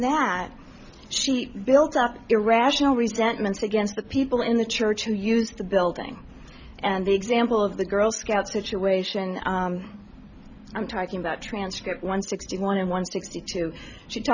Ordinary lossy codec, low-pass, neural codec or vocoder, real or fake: Opus, 64 kbps; 7.2 kHz; none; real